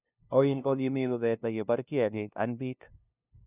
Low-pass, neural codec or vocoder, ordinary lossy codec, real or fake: 3.6 kHz; codec, 16 kHz, 0.5 kbps, FunCodec, trained on LibriTTS, 25 frames a second; none; fake